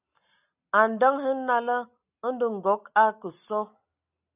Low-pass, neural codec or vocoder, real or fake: 3.6 kHz; none; real